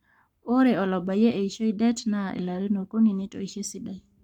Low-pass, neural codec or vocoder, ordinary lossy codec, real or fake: 19.8 kHz; codec, 44.1 kHz, 7.8 kbps, Pupu-Codec; none; fake